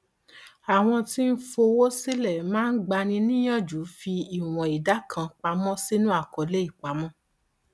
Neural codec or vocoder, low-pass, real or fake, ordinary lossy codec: none; none; real; none